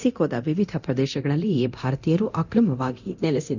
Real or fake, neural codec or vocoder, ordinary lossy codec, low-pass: fake; codec, 24 kHz, 0.9 kbps, DualCodec; none; 7.2 kHz